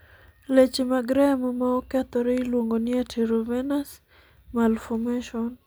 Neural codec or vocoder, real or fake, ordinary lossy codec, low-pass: none; real; none; none